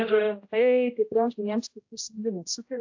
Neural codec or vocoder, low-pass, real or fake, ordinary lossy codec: codec, 16 kHz, 0.5 kbps, X-Codec, HuBERT features, trained on general audio; 7.2 kHz; fake; AAC, 48 kbps